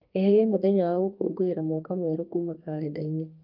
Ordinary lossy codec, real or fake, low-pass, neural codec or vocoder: Opus, 32 kbps; fake; 5.4 kHz; codec, 32 kHz, 1.9 kbps, SNAC